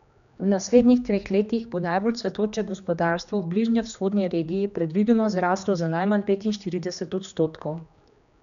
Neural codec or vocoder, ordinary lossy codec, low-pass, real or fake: codec, 16 kHz, 2 kbps, X-Codec, HuBERT features, trained on general audio; none; 7.2 kHz; fake